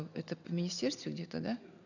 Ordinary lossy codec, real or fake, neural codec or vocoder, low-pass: none; real; none; 7.2 kHz